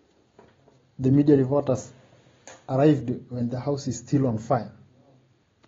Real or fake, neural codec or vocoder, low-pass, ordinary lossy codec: real; none; 7.2 kHz; AAC, 24 kbps